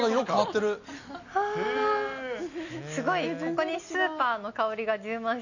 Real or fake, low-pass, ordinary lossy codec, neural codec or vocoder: real; 7.2 kHz; none; none